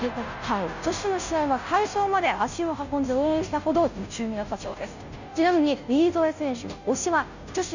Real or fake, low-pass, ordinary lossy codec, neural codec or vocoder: fake; 7.2 kHz; none; codec, 16 kHz, 0.5 kbps, FunCodec, trained on Chinese and English, 25 frames a second